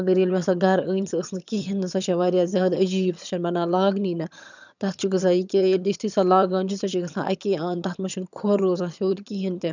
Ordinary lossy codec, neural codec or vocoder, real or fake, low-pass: none; vocoder, 22.05 kHz, 80 mel bands, HiFi-GAN; fake; 7.2 kHz